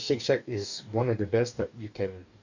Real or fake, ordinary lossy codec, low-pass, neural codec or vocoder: fake; none; 7.2 kHz; codec, 44.1 kHz, 2.6 kbps, DAC